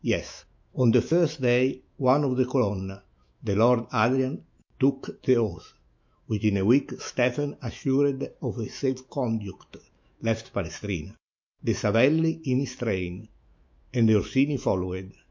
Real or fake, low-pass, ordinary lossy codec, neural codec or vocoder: fake; 7.2 kHz; MP3, 48 kbps; autoencoder, 48 kHz, 128 numbers a frame, DAC-VAE, trained on Japanese speech